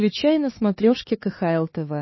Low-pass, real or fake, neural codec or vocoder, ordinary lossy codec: 7.2 kHz; fake; codec, 16 kHz, 4 kbps, X-Codec, HuBERT features, trained on LibriSpeech; MP3, 24 kbps